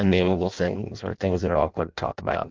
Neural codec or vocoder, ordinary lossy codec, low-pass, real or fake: codec, 16 kHz in and 24 kHz out, 0.6 kbps, FireRedTTS-2 codec; Opus, 24 kbps; 7.2 kHz; fake